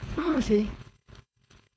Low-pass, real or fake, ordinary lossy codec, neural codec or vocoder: none; fake; none; codec, 16 kHz, 4.8 kbps, FACodec